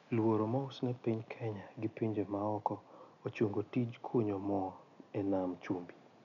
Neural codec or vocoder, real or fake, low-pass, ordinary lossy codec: none; real; 7.2 kHz; none